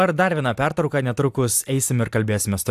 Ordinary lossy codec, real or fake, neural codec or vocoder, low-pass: AAC, 96 kbps; real; none; 14.4 kHz